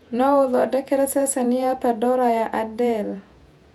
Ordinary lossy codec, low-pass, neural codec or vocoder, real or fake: none; 19.8 kHz; vocoder, 48 kHz, 128 mel bands, Vocos; fake